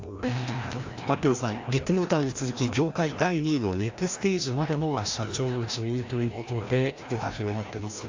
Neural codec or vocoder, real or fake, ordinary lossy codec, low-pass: codec, 16 kHz, 1 kbps, FreqCodec, larger model; fake; AAC, 48 kbps; 7.2 kHz